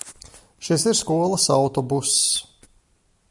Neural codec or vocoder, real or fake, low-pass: none; real; 10.8 kHz